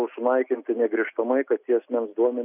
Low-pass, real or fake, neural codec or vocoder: 3.6 kHz; real; none